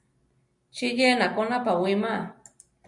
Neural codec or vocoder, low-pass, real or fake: none; 10.8 kHz; real